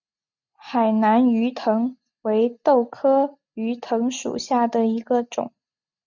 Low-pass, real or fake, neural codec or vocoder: 7.2 kHz; real; none